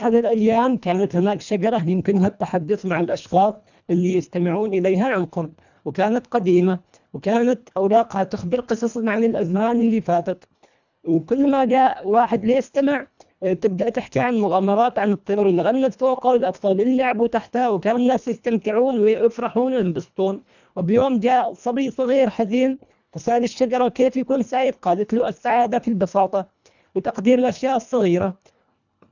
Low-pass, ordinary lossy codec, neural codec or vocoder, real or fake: 7.2 kHz; none; codec, 24 kHz, 1.5 kbps, HILCodec; fake